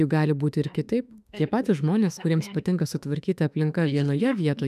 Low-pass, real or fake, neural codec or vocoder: 14.4 kHz; fake; autoencoder, 48 kHz, 32 numbers a frame, DAC-VAE, trained on Japanese speech